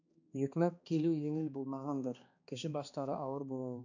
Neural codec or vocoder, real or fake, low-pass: codec, 16 kHz, 2 kbps, X-Codec, HuBERT features, trained on balanced general audio; fake; 7.2 kHz